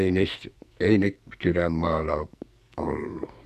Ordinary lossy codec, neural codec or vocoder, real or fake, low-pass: none; codec, 44.1 kHz, 2.6 kbps, SNAC; fake; 14.4 kHz